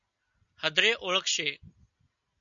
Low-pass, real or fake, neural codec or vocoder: 7.2 kHz; real; none